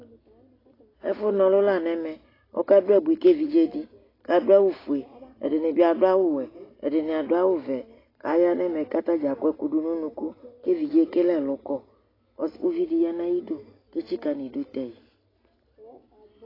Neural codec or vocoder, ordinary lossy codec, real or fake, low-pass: none; AAC, 24 kbps; real; 5.4 kHz